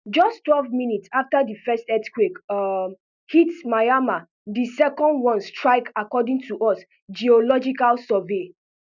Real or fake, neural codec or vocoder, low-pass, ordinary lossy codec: real; none; 7.2 kHz; none